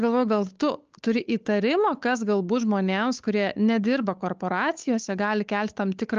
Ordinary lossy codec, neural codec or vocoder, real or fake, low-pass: Opus, 24 kbps; codec, 16 kHz, 8 kbps, FunCodec, trained on Chinese and English, 25 frames a second; fake; 7.2 kHz